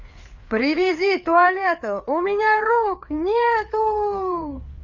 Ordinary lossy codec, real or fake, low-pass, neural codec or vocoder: none; fake; 7.2 kHz; codec, 16 kHz, 4 kbps, FreqCodec, larger model